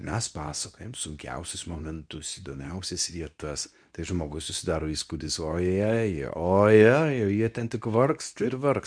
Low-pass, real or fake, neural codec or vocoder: 9.9 kHz; fake; codec, 24 kHz, 0.9 kbps, WavTokenizer, medium speech release version 2